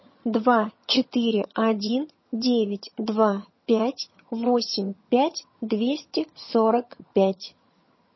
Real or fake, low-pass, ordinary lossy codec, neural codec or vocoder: fake; 7.2 kHz; MP3, 24 kbps; vocoder, 22.05 kHz, 80 mel bands, HiFi-GAN